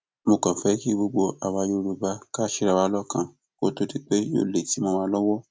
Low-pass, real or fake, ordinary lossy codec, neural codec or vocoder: none; real; none; none